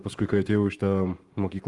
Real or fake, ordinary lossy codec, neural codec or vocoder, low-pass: fake; Opus, 32 kbps; codec, 44.1 kHz, 7.8 kbps, Pupu-Codec; 10.8 kHz